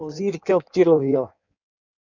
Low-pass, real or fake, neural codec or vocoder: 7.2 kHz; fake; codec, 16 kHz in and 24 kHz out, 1.1 kbps, FireRedTTS-2 codec